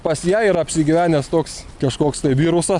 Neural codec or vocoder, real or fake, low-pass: none; real; 10.8 kHz